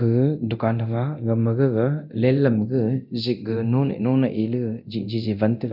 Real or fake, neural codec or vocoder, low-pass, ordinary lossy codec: fake; codec, 24 kHz, 0.9 kbps, DualCodec; 5.4 kHz; none